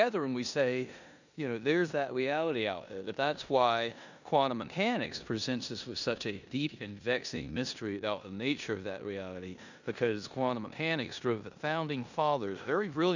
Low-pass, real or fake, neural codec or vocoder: 7.2 kHz; fake; codec, 16 kHz in and 24 kHz out, 0.9 kbps, LongCat-Audio-Codec, four codebook decoder